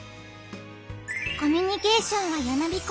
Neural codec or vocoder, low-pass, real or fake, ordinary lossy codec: none; none; real; none